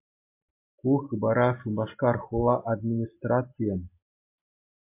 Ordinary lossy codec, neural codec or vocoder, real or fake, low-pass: MP3, 24 kbps; none; real; 3.6 kHz